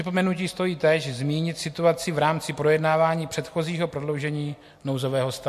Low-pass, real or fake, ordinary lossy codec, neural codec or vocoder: 14.4 kHz; real; MP3, 64 kbps; none